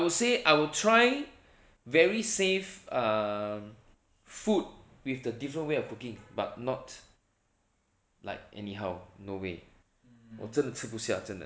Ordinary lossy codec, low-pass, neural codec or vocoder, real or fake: none; none; none; real